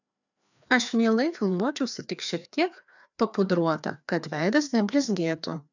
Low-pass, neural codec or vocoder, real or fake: 7.2 kHz; codec, 16 kHz, 2 kbps, FreqCodec, larger model; fake